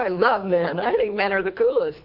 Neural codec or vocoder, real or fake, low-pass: codec, 24 kHz, 3 kbps, HILCodec; fake; 5.4 kHz